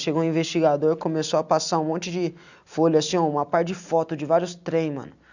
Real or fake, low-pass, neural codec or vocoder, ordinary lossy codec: real; 7.2 kHz; none; none